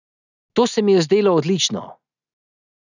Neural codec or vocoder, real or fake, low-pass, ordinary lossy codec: none; real; 7.2 kHz; none